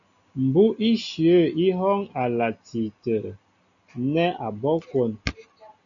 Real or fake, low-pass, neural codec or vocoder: real; 7.2 kHz; none